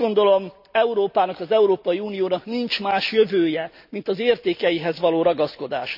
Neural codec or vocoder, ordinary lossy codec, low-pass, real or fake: none; none; 5.4 kHz; real